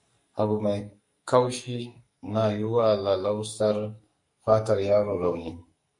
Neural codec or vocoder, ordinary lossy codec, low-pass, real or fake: codec, 44.1 kHz, 2.6 kbps, SNAC; MP3, 48 kbps; 10.8 kHz; fake